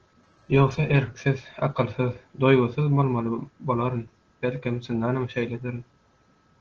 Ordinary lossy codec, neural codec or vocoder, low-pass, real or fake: Opus, 16 kbps; none; 7.2 kHz; real